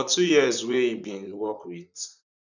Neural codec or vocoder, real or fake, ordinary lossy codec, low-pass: vocoder, 44.1 kHz, 128 mel bands, Pupu-Vocoder; fake; none; 7.2 kHz